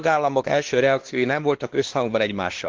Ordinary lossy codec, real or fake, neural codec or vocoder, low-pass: Opus, 16 kbps; fake; codec, 16 kHz, 2 kbps, X-Codec, WavLM features, trained on Multilingual LibriSpeech; 7.2 kHz